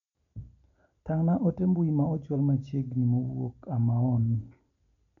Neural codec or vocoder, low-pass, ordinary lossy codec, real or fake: none; 7.2 kHz; none; real